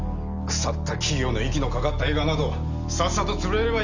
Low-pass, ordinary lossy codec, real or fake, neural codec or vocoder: 7.2 kHz; none; real; none